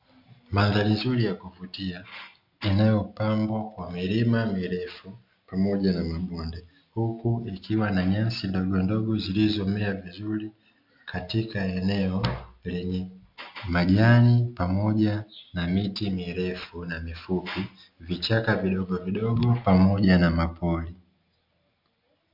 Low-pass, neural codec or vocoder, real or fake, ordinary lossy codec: 5.4 kHz; none; real; MP3, 48 kbps